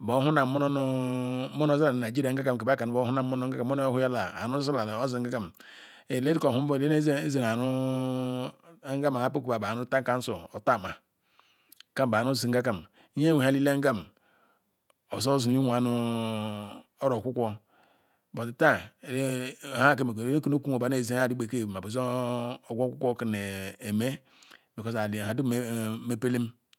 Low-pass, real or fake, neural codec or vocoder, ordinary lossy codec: 19.8 kHz; fake; vocoder, 48 kHz, 128 mel bands, Vocos; none